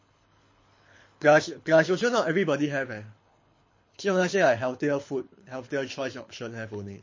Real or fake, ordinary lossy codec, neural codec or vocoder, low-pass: fake; MP3, 32 kbps; codec, 24 kHz, 6 kbps, HILCodec; 7.2 kHz